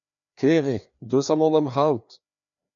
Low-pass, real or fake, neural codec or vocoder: 7.2 kHz; fake; codec, 16 kHz, 2 kbps, FreqCodec, larger model